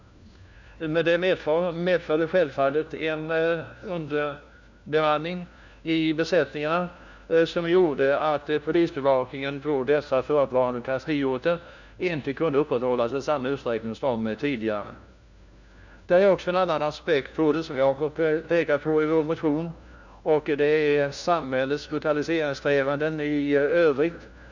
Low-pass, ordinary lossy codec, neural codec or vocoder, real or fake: 7.2 kHz; none; codec, 16 kHz, 1 kbps, FunCodec, trained on LibriTTS, 50 frames a second; fake